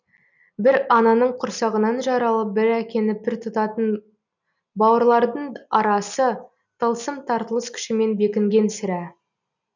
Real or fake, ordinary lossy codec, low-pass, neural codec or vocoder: real; none; 7.2 kHz; none